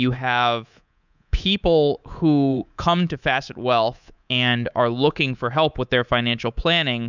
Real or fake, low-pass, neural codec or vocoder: fake; 7.2 kHz; codec, 24 kHz, 3.1 kbps, DualCodec